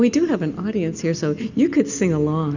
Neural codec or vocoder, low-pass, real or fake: none; 7.2 kHz; real